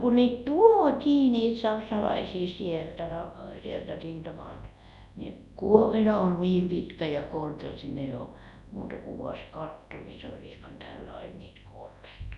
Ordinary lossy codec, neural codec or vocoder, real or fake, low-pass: none; codec, 24 kHz, 0.9 kbps, WavTokenizer, large speech release; fake; 10.8 kHz